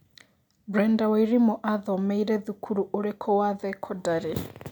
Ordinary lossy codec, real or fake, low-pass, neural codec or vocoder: none; real; 19.8 kHz; none